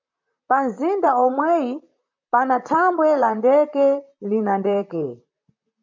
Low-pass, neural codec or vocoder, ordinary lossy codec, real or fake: 7.2 kHz; vocoder, 44.1 kHz, 128 mel bands, Pupu-Vocoder; MP3, 64 kbps; fake